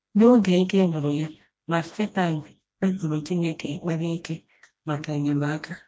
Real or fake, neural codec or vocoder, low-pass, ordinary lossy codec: fake; codec, 16 kHz, 1 kbps, FreqCodec, smaller model; none; none